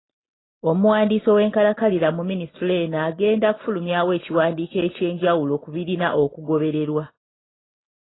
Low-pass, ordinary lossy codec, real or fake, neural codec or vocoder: 7.2 kHz; AAC, 16 kbps; real; none